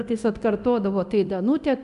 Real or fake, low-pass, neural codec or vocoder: fake; 10.8 kHz; codec, 24 kHz, 0.9 kbps, DualCodec